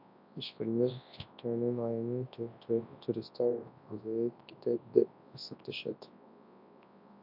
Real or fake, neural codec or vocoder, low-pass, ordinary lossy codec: fake; codec, 24 kHz, 0.9 kbps, WavTokenizer, large speech release; 5.4 kHz; MP3, 48 kbps